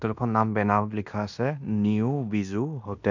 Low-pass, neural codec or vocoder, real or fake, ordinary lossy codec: 7.2 kHz; codec, 16 kHz in and 24 kHz out, 0.9 kbps, LongCat-Audio-Codec, fine tuned four codebook decoder; fake; none